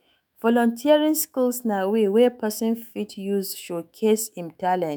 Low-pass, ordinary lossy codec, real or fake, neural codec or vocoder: none; none; fake; autoencoder, 48 kHz, 128 numbers a frame, DAC-VAE, trained on Japanese speech